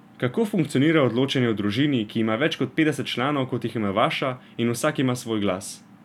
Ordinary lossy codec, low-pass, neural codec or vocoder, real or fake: none; 19.8 kHz; none; real